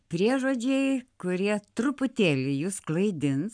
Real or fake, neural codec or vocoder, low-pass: fake; codec, 44.1 kHz, 7.8 kbps, Pupu-Codec; 9.9 kHz